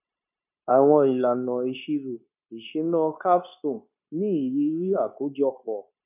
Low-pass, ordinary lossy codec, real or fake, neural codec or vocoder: 3.6 kHz; none; fake; codec, 16 kHz, 0.9 kbps, LongCat-Audio-Codec